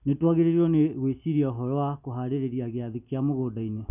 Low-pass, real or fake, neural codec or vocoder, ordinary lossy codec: 3.6 kHz; real; none; none